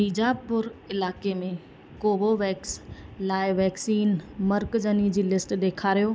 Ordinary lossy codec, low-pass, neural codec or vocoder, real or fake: none; none; none; real